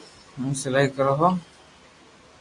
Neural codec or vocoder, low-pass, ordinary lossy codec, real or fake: none; 10.8 kHz; MP3, 48 kbps; real